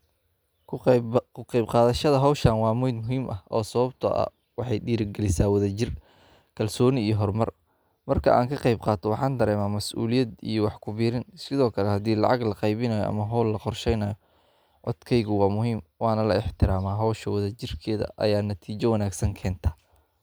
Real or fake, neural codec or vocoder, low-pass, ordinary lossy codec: real; none; none; none